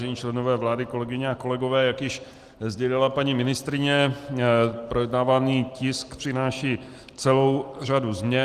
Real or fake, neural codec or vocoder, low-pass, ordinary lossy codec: real; none; 14.4 kHz; Opus, 24 kbps